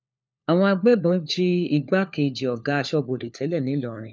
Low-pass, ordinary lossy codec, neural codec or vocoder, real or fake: none; none; codec, 16 kHz, 4 kbps, FunCodec, trained on LibriTTS, 50 frames a second; fake